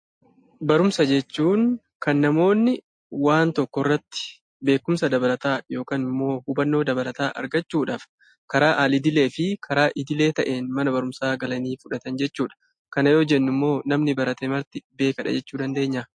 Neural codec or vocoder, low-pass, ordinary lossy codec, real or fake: none; 9.9 kHz; MP3, 48 kbps; real